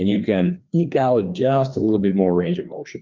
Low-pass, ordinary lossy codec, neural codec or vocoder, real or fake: 7.2 kHz; Opus, 24 kbps; codec, 16 kHz, 2 kbps, FreqCodec, larger model; fake